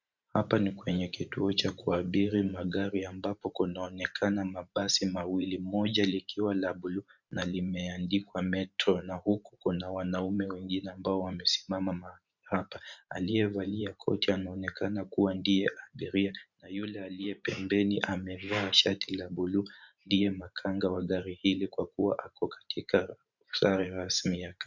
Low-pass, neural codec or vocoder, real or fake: 7.2 kHz; vocoder, 44.1 kHz, 128 mel bands every 512 samples, BigVGAN v2; fake